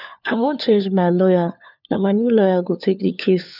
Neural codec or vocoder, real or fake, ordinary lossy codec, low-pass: codec, 16 kHz, 4 kbps, FunCodec, trained on LibriTTS, 50 frames a second; fake; none; 5.4 kHz